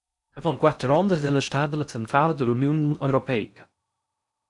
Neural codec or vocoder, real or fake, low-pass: codec, 16 kHz in and 24 kHz out, 0.6 kbps, FocalCodec, streaming, 4096 codes; fake; 10.8 kHz